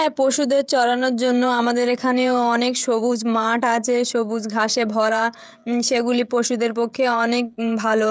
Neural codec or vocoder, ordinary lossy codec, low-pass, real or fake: codec, 16 kHz, 16 kbps, FreqCodec, smaller model; none; none; fake